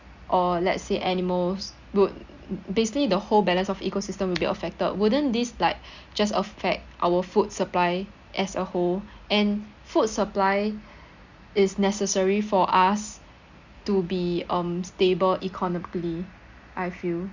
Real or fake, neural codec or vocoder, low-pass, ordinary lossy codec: real; none; 7.2 kHz; Opus, 64 kbps